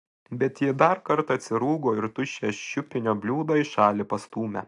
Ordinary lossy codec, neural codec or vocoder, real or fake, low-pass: AAC, 64 kbps; none; real; 10.8 kHz